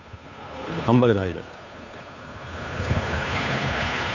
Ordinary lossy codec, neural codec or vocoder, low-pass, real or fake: none; codec, 16 kHz, 2 kbps, FunCodec, trained on Chinese and English, 25 frames a second; 7.2 kHz; fake